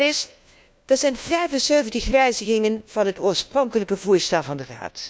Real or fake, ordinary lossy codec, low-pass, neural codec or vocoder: fake; none; none; codec, 16 kHz, 1 kbps, FunCodec, trained on LibriTTS, 50 frames a second